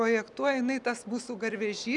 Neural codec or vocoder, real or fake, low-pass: none; real; 10.8 kHz